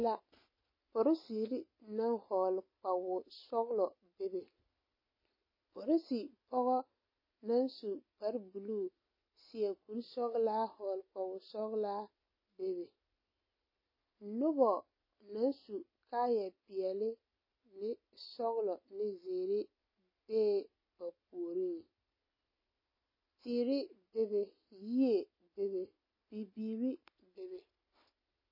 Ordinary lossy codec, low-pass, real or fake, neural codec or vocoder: MP3, 24 kbps; 5.4 kHz; real; none